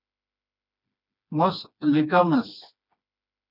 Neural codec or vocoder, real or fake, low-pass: codec, 16 kHz, 2 kbps, FreqCodec, smaller model; fake; 5.4 kHz